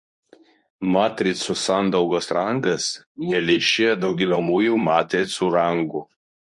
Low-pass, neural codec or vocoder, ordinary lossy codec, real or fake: 10.8 kHz; codec, 24 kHz, 0.9 kbps, WavTokenizer, medium speech release version 2; MP3, 48 kbps; fake